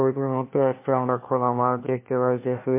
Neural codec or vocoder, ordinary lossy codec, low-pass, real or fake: codec, 16 kHz, 1 kbps, FunCodec, trained on LibriTTS, 50 frames a second; MP3, 24 kbps; 3.6 kHz; fake